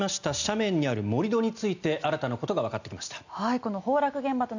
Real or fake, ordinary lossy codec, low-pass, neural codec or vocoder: real; none; 7.2 kHz; none